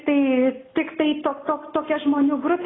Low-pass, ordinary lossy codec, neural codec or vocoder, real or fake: 7.2 kHz; AAC, 16 kbps; none; real